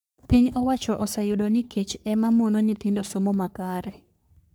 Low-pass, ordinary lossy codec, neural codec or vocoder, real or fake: none; none; codec, 44.1 kHz, 3.4 kbps, Pupu-Codec; fake